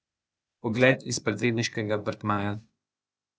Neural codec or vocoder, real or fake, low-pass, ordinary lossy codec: codec, 16 kHz, 0.8 kbps, ZipCodec; fake; none; none